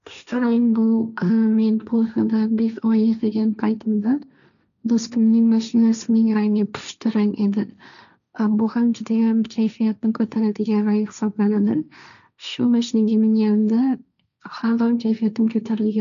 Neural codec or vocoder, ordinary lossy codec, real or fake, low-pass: codec, 16 kHz, 1.1 kbps, Voila-Tokenizer; none; fake; 7.2 kHz